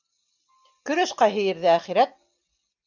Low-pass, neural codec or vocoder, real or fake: 7.2 kHz; none; real